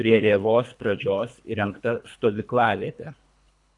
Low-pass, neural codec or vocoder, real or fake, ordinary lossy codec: 10.8 kHz; codec, 24 kHz, 3 kbps, HILCodec; fake; AAC, 64 kbps